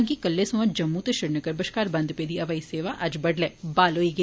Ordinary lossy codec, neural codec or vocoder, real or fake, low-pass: none; none; real; none